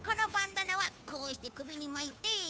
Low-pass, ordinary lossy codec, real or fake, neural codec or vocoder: none; none; fake; codec, 16 kHz, 2 kbps, FunCodec, trained on Chinese and English, 25 frames a second